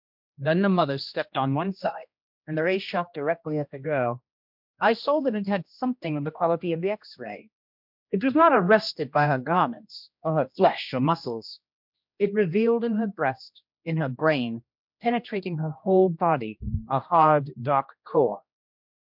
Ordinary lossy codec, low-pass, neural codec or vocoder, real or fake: MP3, 48 kbps; 5.4 kHz; codec, 16 kHz, 1 kbps, X-Codec, HuBERT features, trained on general audio; fake